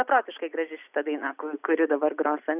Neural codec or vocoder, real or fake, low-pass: none; real; 3.6 kHz